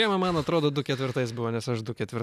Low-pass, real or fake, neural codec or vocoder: 14.4 kHz; real; none